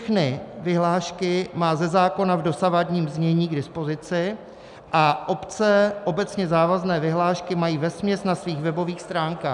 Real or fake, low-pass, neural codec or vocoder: real; 10.8 kHz; none